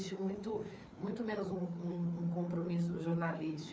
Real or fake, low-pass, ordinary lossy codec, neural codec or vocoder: fake; none; none; codec, 16 kHz, 4 kbps, FreqCodec, larger model